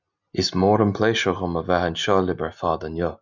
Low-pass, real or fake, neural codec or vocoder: 7.2 kHz; real; none